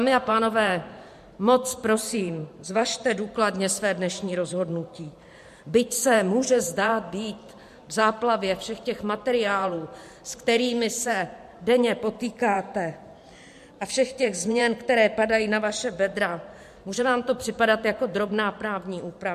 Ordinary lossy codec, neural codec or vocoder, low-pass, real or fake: MP3, 64 kbps; vocoder, 44.1 kHz, 128 mel bands every 512 samples, BigVGAN v2; 14.4 kHz; fake